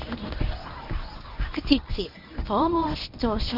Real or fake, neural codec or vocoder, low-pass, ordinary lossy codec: fake; codec, 24 kHz, 0.9 kbps, WavTokenizer, medium speech release version 1; 5.4 kHz; none